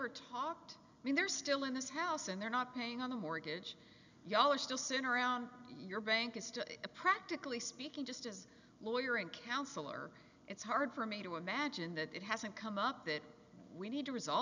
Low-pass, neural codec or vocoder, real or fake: 7.2 kHz; none; real